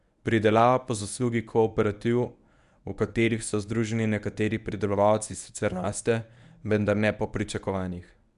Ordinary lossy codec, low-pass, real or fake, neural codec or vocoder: none; 10.8 kHz; fake; codec, 24 kHz, 0.9 kbps, WavTokenizer, medium speech release version 1